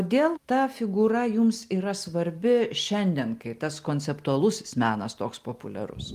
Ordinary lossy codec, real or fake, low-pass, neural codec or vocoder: Opus, 32 kbps; real; 14.4 kHz; none